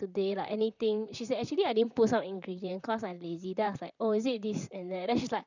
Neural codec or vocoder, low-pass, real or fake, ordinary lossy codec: vocoder, 44.1 kHz, 128 mel bands, Pupu-Vocoder; 7.2 kHz; fake; none